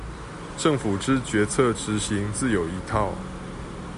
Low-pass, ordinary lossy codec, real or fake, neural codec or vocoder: 10.8 kHz; MP3, 96 kbps; real; none